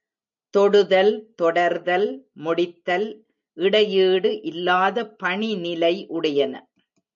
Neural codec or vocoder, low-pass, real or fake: none; 7.2 kHz; real